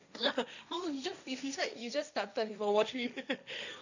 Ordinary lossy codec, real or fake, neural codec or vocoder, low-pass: none; fake; codec, 16 kHz, 1.1 kbps, Voila-Tokenizer; 7.2 kHz